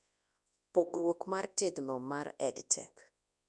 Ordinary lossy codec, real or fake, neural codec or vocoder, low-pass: none; fake; codec, 24 kHz, 0.9 kbps, WavTokenizer, large speech release; 10.8 kHz